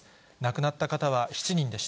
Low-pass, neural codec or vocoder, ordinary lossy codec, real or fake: none; none; none; real